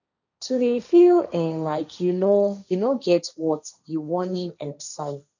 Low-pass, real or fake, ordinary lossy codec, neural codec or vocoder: 7.2 kHz; fake; none; codec, 16 kHz, 1.1 kbps, Voila-Tokenizer